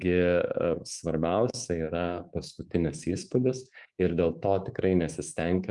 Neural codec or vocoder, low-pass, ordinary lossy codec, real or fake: codec, 24 kHz, 3.1 kbps, DualCodec; 10.8 kHz; Opus, 32 kbps; fake